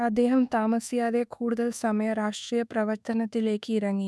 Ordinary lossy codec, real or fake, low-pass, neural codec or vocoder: none; fake; none; codec, 24 kHz, 1.2 kbps, DualCodec